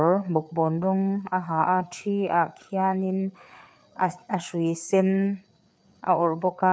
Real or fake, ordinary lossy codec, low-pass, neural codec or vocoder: fake; none; none; codec, 16 kHz, 4 kbps, FreqCodec, larger model